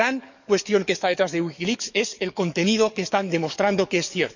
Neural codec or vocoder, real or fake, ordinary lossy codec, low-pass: codec, 44.1 kHz, 7.8 kbps, DAC; fake; none; 7.2 kHz